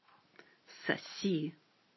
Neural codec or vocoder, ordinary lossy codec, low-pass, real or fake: none; MP3, 24 kbps; 7.2 kHz; real